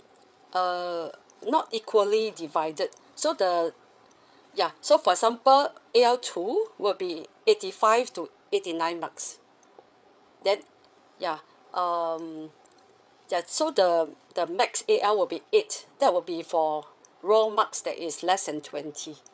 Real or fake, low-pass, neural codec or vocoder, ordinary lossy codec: fake; none; codec, 16 kHz, 8 kbps, FreqCodec, larger model; none